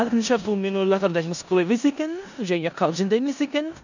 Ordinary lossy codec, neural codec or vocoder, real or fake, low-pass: none; codec, 16 kHz in and 24 kHz out, 0.9 kbps, LongCat-Audio-Codec, four codebook decoder; fake; 7.2 kHz